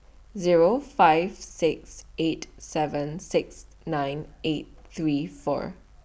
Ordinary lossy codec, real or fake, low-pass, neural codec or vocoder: none; real; none; none